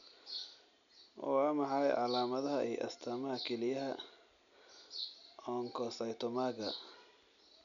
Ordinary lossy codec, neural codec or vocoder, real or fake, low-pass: none; none; real; 7.2 kHz